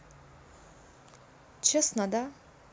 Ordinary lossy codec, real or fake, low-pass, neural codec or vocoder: none; real; none; none